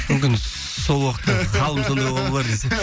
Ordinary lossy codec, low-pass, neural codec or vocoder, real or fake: none; none; none; real